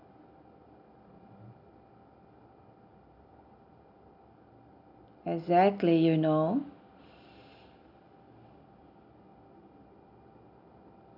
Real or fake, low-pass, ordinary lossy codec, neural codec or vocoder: real; 5.4 kHz; none; none